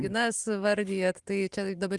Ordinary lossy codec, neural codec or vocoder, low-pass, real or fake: Opus, 24 kbps; vocoder, 44.1 kHz, 128 mel bands every 512 samples, BigVGAN v2; 10.8 kHz; fake